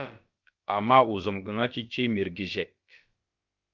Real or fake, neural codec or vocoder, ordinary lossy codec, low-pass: fake; codec, 16 kHz, about 1 kbps, DyCAST, with the encoder's durations; Opus, 32 kbps; 7.2 kHz